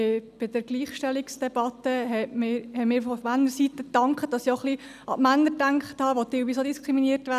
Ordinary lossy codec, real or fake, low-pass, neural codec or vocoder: none; real; 14.4 kHz; none